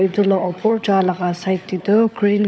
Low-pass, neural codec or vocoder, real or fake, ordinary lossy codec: none; codec, 16 kHz, 16 kbps, FunCodec, trained on LibriTTS, 50 frames a second; fake; none